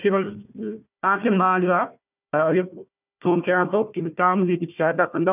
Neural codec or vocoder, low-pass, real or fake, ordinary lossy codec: codec, 16 kHz, 1 kbps, FunCodec, trained on Chinese and English, 50 frames a second; 3.6 kHz; fake; none